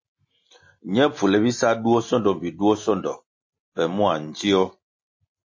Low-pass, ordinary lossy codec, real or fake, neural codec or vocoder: 7.2 kHz; MP3, 32 kbps; real; none